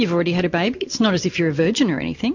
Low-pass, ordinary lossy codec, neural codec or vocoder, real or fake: 7.2 kHz; MP3, 48 kbps; none; real